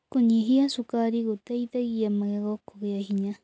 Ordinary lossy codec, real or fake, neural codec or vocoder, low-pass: none; real; none; none